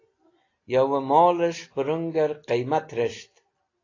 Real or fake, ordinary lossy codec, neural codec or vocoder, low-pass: real; AAC, 32 kbps; none; 7.2 kHz